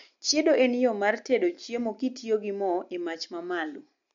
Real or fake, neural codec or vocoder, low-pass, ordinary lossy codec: real; none; 7.2 kHz; MP3, 48 kbps